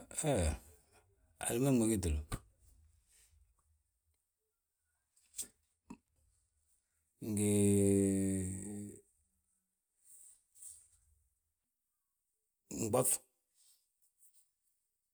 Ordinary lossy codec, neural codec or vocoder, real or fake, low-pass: none; none; real; none